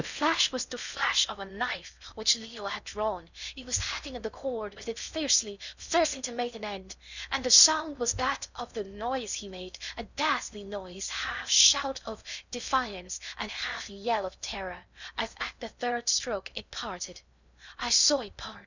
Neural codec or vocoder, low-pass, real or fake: codec, 16 kHz in and 24 kHz out, 0.6 kbps, FocalCodec, streaming, 4096 codes; 7.2 kHz; fake